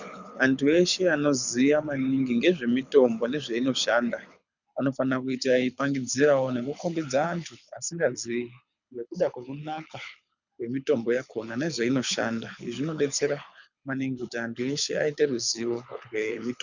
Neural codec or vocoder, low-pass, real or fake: codec, 24 kHz, 6 kbps, HILCodec; 7.2 kHz; fake